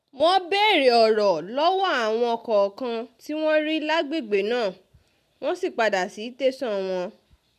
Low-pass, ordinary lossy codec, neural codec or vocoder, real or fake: 14.4 kHz; none; none; real